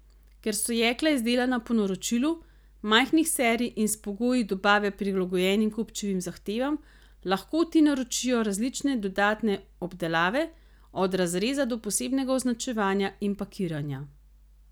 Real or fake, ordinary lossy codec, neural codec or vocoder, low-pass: real; none; none; none